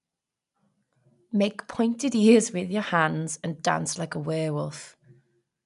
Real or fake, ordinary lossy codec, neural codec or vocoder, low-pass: real; none; none; 10.8 kHz